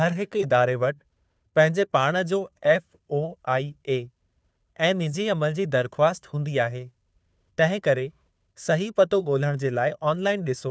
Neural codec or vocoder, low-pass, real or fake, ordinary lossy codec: codec, 16 kHz, 2 kbps, FunCodec, trained on Chinese and English, 25 frames a second; none; fake; none